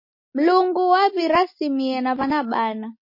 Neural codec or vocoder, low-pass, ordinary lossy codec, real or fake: none; 5.4 kHz; MP3, 24 kbps; real